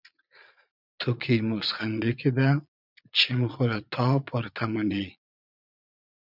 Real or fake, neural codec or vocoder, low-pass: fake; vocoder, 22.05 kHz, 80 mel bands, Vocos; 5.4 kHz